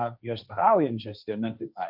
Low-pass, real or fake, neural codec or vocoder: 5.4 kHz; fake; codec, 16 kHz, 1.1 kbps, Voila-Tokenizer